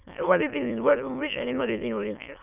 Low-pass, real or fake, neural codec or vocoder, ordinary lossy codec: 3.6 kHz; fake; autoencoder, 22.05 kHz, a latent of 192 numbers a frame, VITS, trained on many speakers; none